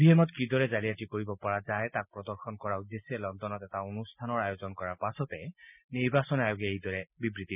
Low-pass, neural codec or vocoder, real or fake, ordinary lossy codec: 3.6 kHz; none; real; none